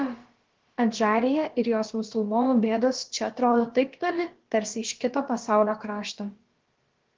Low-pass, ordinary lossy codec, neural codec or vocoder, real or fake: 7.2 kHz; Opus, 16 kbps; codec, 16 kHz, about 1 kbps, DyCAST, with the encoder's durations; fake